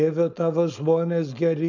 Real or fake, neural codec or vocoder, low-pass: fake; codec, 16 kHz, 4.8 kbps, FACodec; 7.2 kHz